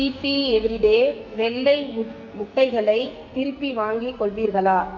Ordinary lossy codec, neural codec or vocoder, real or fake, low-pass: none; codec, 44.1 kHz, 2.6 kbps, SNAC; fake; 7.2 kHz